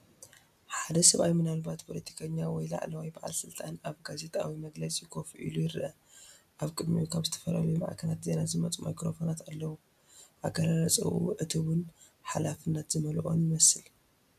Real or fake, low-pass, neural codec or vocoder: real; 14.4 kHz; none